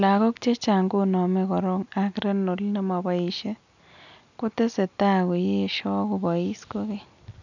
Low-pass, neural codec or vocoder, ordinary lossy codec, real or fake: 7.2 kHz; none; none; real